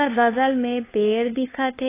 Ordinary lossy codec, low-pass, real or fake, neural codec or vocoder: AAC, 16 kbps; 3.6 kHz; fake; codec, 16 kHz, 2 kbps, FunCodec, trained on LibriTTS, 25 frames a second